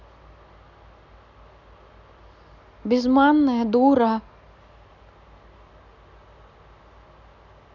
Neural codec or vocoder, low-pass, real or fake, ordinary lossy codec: none; 7.2 kHz; real; none